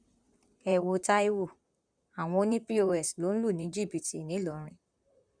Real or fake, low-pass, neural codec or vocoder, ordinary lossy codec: fake; 9.9 kHz; vocoder, 44.1 kHz, 128 mel bands, Pupu-Vocoder; none